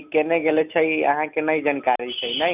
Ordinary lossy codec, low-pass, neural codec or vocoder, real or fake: none; 3.6 kHz; none; real